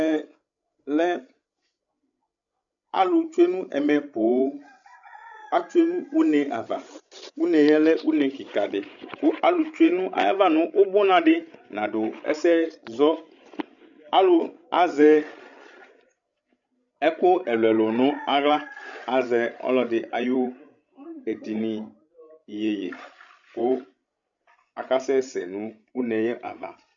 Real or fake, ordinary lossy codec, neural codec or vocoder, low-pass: fake; MP3, 96 kbps; codec, 16 kHz, 16 kbps, FreqCodec, larger model; 7.2 kHz